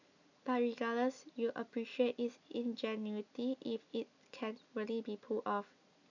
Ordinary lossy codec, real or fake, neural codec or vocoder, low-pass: none; real; none; 7.2 kHz